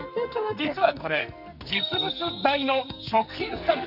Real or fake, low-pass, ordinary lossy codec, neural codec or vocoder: fake; 5.4 kHz; none; codec, 44.1 kHz, 2.6 kbps, SNAC